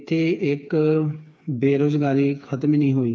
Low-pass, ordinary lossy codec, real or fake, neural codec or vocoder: none; none; fake; codec, 16 kHz, 4 kbps, FreqCodec, smaller model